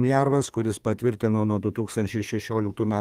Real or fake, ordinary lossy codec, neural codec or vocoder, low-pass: fake; Opus, 32 kbps; codec, 32 kHz, 1.9 kbps, SNAC; 14.4 kHz